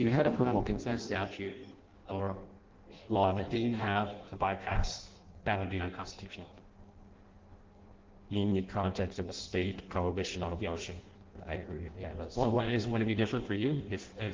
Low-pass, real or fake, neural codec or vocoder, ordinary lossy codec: 7.2 kHz; fake; codec, 16 kHz in and 24 kHz out, 0.6 kbps, FireRedTTS-2 codec; Opus, 16 kbps